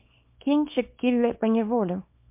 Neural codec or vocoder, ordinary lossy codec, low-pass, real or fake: codec, 24 kHz, 0.9 kbps, WavTokenizer, small release; MP3, 32 kbps; 3.6 kHz; fake